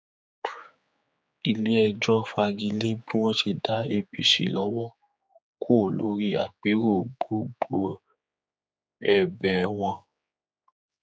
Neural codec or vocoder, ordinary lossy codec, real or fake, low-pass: codec, 16 kHz, 4 kbps, X-Codec, HuBERT features, trained on general audio; none; fake; none